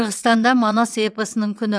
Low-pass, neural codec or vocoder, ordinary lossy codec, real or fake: none; vocoder, 22.05 kHz, 80 mel bands, WaveNeXt; none; fake